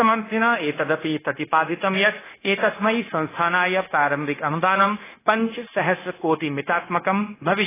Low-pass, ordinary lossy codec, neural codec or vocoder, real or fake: 3.6 kHz; AAC, 16 kbps; codec, 16 kHz, 0.9 kbps, LongCat-Audio-Codec; fake